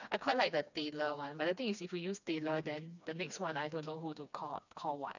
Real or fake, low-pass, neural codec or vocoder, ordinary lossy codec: fake; 7.2 kHz; codec, 16 kHz, 2 kbps, FreqCodec, smaller model; none